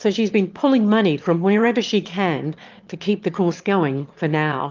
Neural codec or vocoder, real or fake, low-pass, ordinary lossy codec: autoencoder, 22.05 kHz, a latent of 192 numbers a frame, VITS, trained on one speaker; fake; 7.2 kHz; Opus, 24 kbps